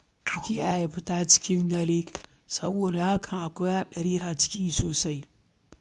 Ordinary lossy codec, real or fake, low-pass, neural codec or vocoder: none; fake; 10.8 kHz; codec, 24 kHz, 0.9 kbps, WavTokenizer, medium speech release version 2